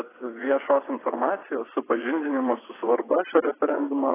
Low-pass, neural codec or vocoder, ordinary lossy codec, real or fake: 3.6 kHz; vocoder, 22.05 kHz, 80 mel bands, WaveNeXt; AAC, 16 kbps; fake